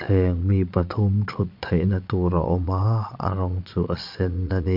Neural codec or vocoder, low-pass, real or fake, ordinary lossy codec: vocoder, 22.05 kHz, 80 mel bands, Vocos; 5.4 kHz; fake; MP3, 48 kbps